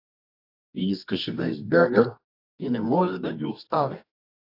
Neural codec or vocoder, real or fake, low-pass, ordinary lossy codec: codec, 24 kHz, 0.9 kbps, WavTokenizer, medium music audio release; fake; 5.4 kHz; AAC, 32 kbps